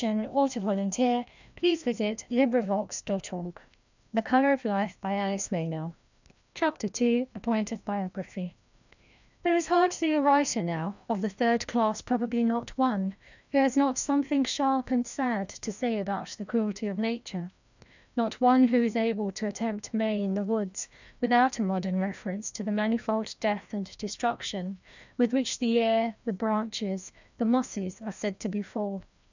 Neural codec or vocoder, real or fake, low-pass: codec, 16 kHz, 1 kbps, FreqCodec, larger model; fake; 7.2 kHz